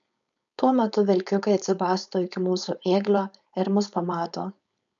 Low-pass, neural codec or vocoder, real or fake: 7.2 kHz; codec, 16 kHz, 4.8 kbps, FACodec; fake